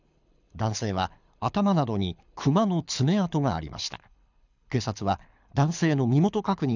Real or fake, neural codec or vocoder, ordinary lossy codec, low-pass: fake; codec, 24 kHz, 6 kbps, HILCodec; none; 7.2 kHz